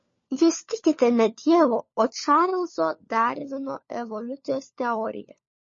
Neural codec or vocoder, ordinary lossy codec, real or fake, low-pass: codec, 16 kHz, 4 kbps, FunCodec, trained on LibriTTS, 50 frames a second; MP3, 32 kbps; fake; 7.2 kHz